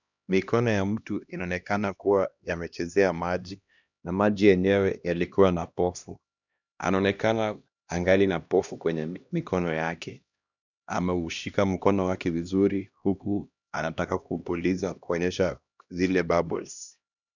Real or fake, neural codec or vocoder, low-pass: fake; codec, 16 kHz, 1 kbps, X-Codec, HuBERT features, trained on LibriSpeech; 7.2 kHz